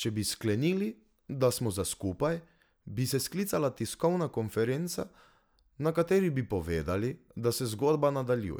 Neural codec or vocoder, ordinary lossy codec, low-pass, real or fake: none; none; none; real